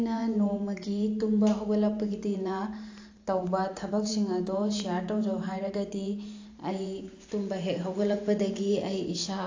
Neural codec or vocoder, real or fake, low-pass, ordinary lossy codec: vocoder, 44.1 kHz, 128 mel bands every 512 samples, BigVGAN v2; fake; 7.2 kHz; AAC, 48 kbps